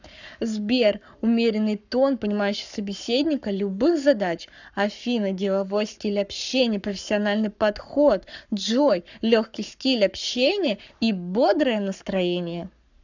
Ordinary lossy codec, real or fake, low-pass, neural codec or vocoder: none; fake; 7.2 kHz; codec, 44.1 kHz, 7.8 kbps, Pupu-Codec